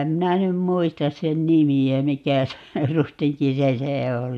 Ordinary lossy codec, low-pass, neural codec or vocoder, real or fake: none; 14.4 kHz; none; real